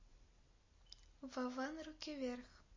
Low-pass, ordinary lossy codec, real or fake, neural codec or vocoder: 7.2 kHz; MP3, 32 kbps; real; none